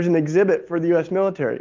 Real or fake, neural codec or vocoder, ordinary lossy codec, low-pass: real; none; Opus, 24 kbps; 7.2 kHz